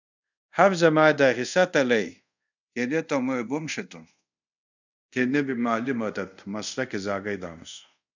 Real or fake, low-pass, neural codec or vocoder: fake; 7.2 kHz; codec, 24 kHz, 0.5 kbps, DualCodec